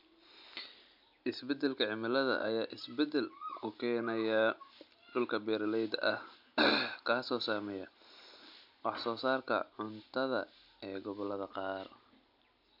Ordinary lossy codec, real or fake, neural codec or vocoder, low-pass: MP3, 48 kbps; real; none; 5.4 kHz